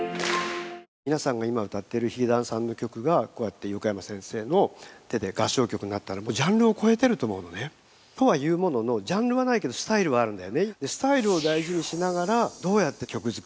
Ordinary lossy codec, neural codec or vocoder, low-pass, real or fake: none; none; none; real